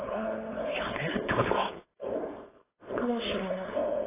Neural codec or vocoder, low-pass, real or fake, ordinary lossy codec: codec, 16 kHz, 4.8 kbps, FACodec; 3.6 kHz; fake; AAC, 16 kbps